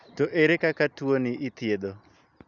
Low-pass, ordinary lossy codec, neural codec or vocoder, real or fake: 7.2 kHz; none; none; real